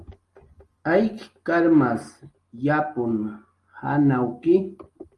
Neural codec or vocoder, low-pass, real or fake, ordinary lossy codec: none; 10.8 kHz; real; Opus, 24 kbps